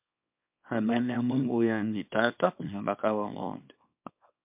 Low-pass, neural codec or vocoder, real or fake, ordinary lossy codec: 3.6 kHz; codec, 24 kHz, 0.9 kbps, WavTokenizer, small release; fake; MP3, 32 kbps